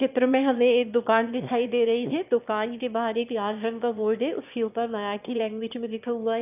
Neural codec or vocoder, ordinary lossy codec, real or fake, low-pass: autoencoder, 22.05 kHz, a latent of 192 numbers a frame, VITS, trained on one speaker; none; fake; 3.6 kHz